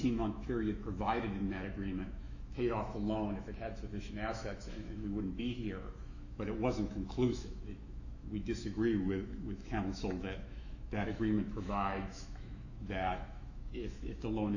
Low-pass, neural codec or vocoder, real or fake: 7.2 kHz; autoencoder, 48 kHz, 128 numbers a frame, DAC-VAE, trained on Japanese speech; fake